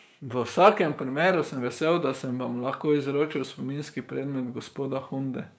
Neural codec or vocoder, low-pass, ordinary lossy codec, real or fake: codec, 16 kHz, 6 kbps, DAC; none; none; fake